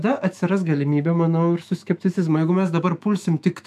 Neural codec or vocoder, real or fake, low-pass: autoencoder, 48 kHz, 128 numbers a frame, DAC-VAE, trained on Japanese speech; fake; 14.4 kHz